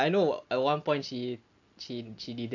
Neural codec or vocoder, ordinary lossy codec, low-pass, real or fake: none; AAC, 48 kbps; 7.2 kHz; real